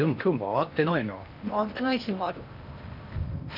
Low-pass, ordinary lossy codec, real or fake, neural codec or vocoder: 5.4 kHz; none; fake; codec, 16 kHz in and 24 kHz out, 0.8 kbps, FocalCodec, streaming, 65536 codes